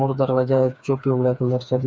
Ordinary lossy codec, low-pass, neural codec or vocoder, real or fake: none; none; codec, 16 kHz, 4 kbps, FreqCodec, smaller model; fake